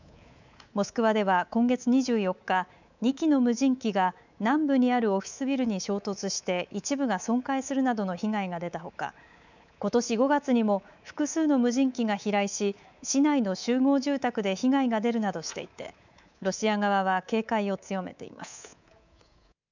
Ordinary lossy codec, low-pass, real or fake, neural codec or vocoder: none; 7.2 kHz; fake; codec, 24 kHz, 3.1 kbps, DualCodec